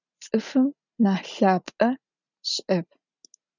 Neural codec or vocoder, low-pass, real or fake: none; 7.2 kHz; real